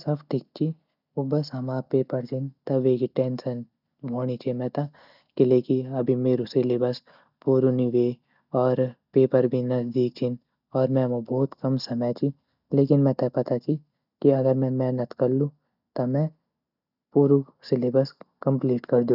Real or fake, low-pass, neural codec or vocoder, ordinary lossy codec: real; 5.4 kHz; none; none